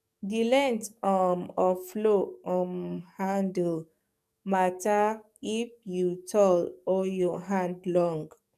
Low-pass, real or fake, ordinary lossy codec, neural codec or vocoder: 14.4 kHz; fake; none; codec, 44.1 kHz, 7.8 kbps, DAC